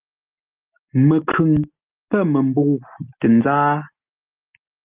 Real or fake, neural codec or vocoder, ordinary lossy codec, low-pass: real; none; Opus, 32 kbps; 3.6 kHz